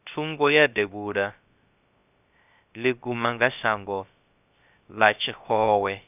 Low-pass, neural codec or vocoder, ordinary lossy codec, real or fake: 3.6 kHz; codec, 16 kHz, 0.3 kbps, FocalCodec; none; fake